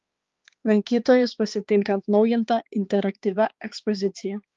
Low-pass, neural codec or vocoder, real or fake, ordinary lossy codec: 7.2 kHz; codec, 16 kHz, 2 kbps, X-Codec, HuBERT features, trained on balanced general audio; fake; Opus, 32 kbps